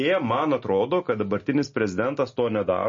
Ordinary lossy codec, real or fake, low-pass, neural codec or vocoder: MP3, 32 kbps; real; 7.2 kHz; none